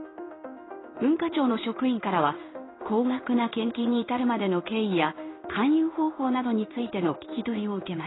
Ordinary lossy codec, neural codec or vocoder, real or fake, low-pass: AAC, 16 kbps; codec, 16 kHz in and 24 kHz out, 1 kbps, XY-Tokenizer; fake; 7.2 kHz